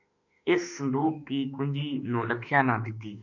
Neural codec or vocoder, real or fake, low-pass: autoencoder, 48 kHz, 32 numbers a frame, DAC-VAE, trained on Japanese speech; fake; 7.2 kHz